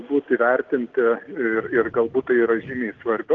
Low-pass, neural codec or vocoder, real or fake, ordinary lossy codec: 7.2 kHz; none; real; Opus, 16 kbps